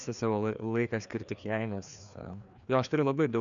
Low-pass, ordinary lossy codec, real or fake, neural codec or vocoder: 7.2 kHz; MP3, 96 kbps; fake; codec, 16 kHz, 2 kbps, FreqCodec, larger model